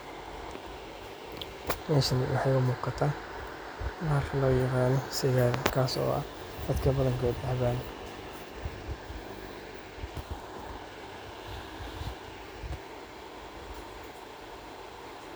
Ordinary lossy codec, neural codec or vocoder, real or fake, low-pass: none; none; real; none